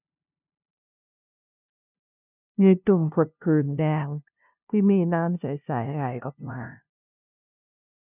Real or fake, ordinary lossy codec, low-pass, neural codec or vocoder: fake; none; 3.6 kHz; codec, 16 kHz, 0.5 kbps, FunCodec, trained on LibriTTS, 25 frames a second